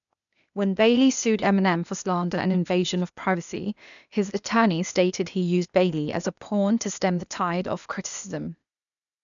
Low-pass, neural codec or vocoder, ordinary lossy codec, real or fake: 7.2 kHz; codec, 16 kHz, 0.8 kbps, ZipCodec; none; fake